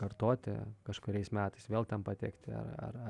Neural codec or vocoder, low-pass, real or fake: none; 10.8 kHz; real